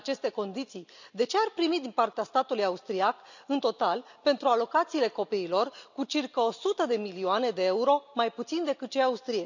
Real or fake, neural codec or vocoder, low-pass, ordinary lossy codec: real; none; 7.2 kHz; none